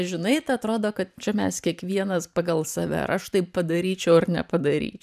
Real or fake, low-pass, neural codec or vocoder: fake; 14.4 kHz; vocoder, 44.1 kHz, 128 mel bands every 512 samples, BigVGAN v2